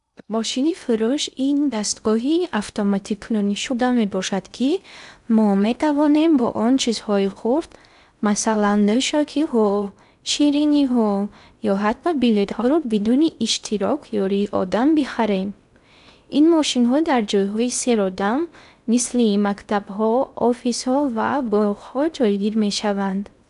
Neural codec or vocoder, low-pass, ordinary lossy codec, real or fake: codec, 16 kHz in and 24 kHz out, 0.6 kbps, FocalCodec, streaming, 2048 codes; 10.8 kHz; none; fake